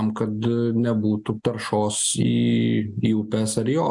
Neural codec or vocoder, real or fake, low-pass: none; real; 10.8 kHz